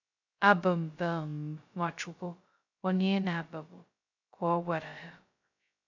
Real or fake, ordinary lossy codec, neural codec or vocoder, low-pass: fake; none; codec, 16 kHz, 0.2 kbps, FocalCodec; 7.2 kHz